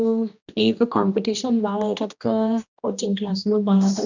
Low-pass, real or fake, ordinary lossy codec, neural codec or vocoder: 7.2 kHz; fake; none; codec, 16 kHz, 1 kbps, X-Codec, HuBERT features, trained on general audio